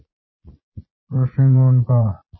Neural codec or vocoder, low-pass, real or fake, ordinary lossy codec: vocoder, 22.05 kHz, 80 mel bands, Vocos; 7.2 kHz; fake; MP3, 24 kbps